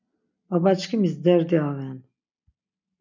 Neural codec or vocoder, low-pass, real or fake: none; 7.2 kHz; real